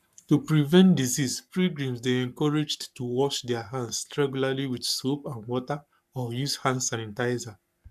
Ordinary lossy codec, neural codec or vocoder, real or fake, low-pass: none; codec, 44.1 kHz, 7.8 kbps, Pupu-Codec; fake; 14.4 kHz